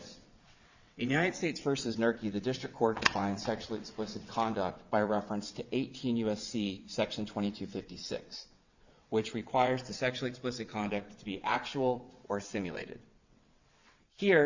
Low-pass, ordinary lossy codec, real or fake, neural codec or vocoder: 7.2 kHz; Opus, 64 kbps; fake; vocoder, 22.05 kHz, 80 mel bands, WaveNeXt